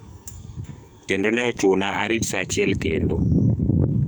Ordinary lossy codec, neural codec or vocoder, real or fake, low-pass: none; codec, 44.1 kHz, 2.6 kbps, SNAC; fake; none